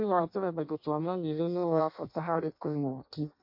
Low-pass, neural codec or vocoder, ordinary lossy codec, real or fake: 5.4 kHz; codec, 16 kHz in and 24 kHz out, 0.6 kbps, FireRedTTS-2 codec; MP3, 32 kbps; fake